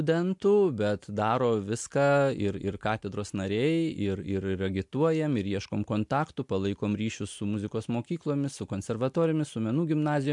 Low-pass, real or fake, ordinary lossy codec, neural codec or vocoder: 10.8 kHz; real; MP3, 64 kbps; none